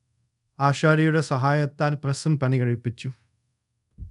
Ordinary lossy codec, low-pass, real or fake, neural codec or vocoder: none; 10.8 kHz; fake; codec, 24 kHz, 0.5 kbps, DualCodec